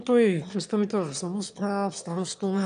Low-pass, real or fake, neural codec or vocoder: 9.9 kHz; fake; autoencoder, 22.05 kHz, a latent of 192 numbers a frame, VITS, trained on one speaker